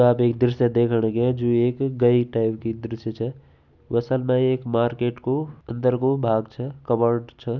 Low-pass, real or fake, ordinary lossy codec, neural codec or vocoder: 7.2 kHz; real; none; none